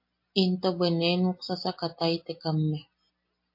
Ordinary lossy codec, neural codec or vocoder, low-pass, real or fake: MP3, 32 kbps; none; 5.4 kHz; real